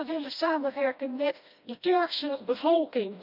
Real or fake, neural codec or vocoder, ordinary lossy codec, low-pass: fake; codec, 16 kHz, 1 kbps, FreqCodec, smaller model; none; 5.4 kHz